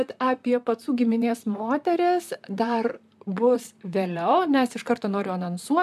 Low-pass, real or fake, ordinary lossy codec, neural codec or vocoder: 14.4 kHz; fake; MP3, 96 kbps; vocoder, 44.1 kHz, 128 mel bands, Pupu-Vocoder